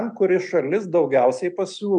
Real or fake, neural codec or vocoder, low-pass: real; none; 9.9 kHz